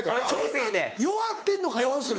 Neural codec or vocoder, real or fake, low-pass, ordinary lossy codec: codec, 16 kHz, 4 kbps, X-Codec, WavLM features, trained on Multilingual LibriSpeech; fake; none; none